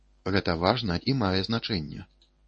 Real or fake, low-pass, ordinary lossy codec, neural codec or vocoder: fake; 10.8 kHz; MP3, 32 kbps; codec, 24 kHz, 0.9 kbps, WavTokenizer, medium speech release version 1